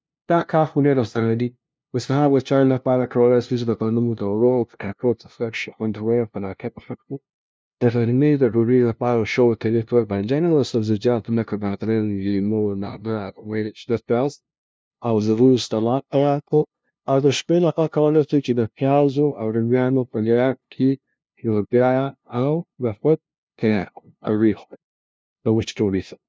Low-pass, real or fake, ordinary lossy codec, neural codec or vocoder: none; fake; none; codec, 16 kHz, 0.5 kbps, FunCodec, trained on LibriTTS, 25 frames a second